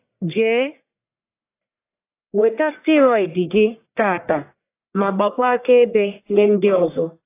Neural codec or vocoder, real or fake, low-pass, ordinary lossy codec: codec, 44.1 kHz, 1.7 kbps, Pupu-Codec; fake; 3.6 kHz; AAC, 24 kbps